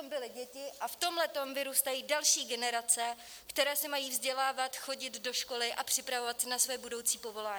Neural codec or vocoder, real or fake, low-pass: none; real; 19.8 kHz